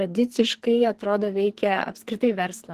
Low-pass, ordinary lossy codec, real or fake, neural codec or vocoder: 14.4 kHz; Opus, 16 kbps; fake; codec, 44.1 kHz, 2.6 kbps, SNAC